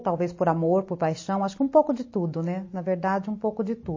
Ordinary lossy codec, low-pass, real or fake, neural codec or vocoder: MP3, 32 kbps; 7.2 kHz; real; none